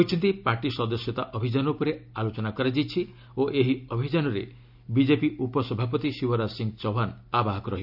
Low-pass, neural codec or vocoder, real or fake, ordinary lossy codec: 5.4 kHz; none; real; none